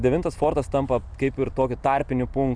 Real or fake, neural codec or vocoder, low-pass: real; none; 9.9 kHz